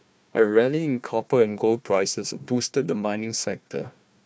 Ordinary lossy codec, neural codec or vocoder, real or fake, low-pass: none; codec, 16 kHz, 1 kbps, FunCodec, trained on Chinese and English, 50 frames a second; fake; none